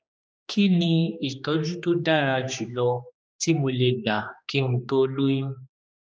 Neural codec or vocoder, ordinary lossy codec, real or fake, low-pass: codec, 16 kHz, 2 kbps, X-Codec, HuBERT features, trained on general audio; none; fake; none